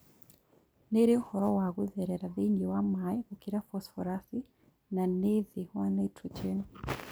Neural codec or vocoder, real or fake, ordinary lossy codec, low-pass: none; real; none; none